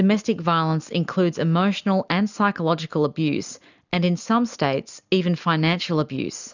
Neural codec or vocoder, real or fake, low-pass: none; real; 7.2 kHz